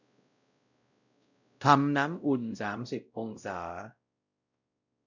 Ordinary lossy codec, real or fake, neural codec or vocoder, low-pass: none; fake; codec, 16 kHz, 0.5 kbps, X-Codec, WavLM features, trained on Multilingual LibriSpeech; 7.2 kHz